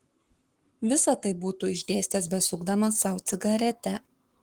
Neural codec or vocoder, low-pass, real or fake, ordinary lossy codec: codec, 44.1 kHz, 7.8 kbps, Pupu-Codec; 19.8 kHz; fake; Opus, 24 kbps